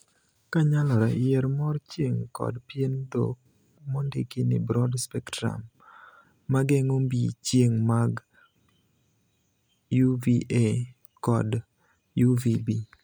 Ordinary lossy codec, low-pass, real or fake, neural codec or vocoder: none; none; real; none